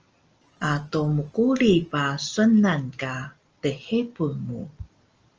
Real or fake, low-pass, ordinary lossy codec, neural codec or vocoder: real; 7.2 kHz; Opus, 24 kbps; none